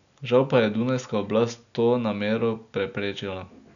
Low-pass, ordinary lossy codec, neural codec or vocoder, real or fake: 7.2 kHz; none; none; real